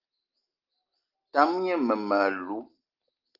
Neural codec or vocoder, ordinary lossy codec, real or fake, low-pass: none; Opus, 32 kbps; real; 5.4 kHz